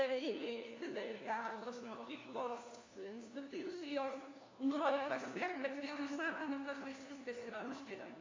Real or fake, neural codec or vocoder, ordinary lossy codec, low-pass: fake; codec, 16 kHz, 1 kbps, FunCodec, trained on LibriTTS, 50 frames a second; AAC, 32 kbps; 7.2 kHz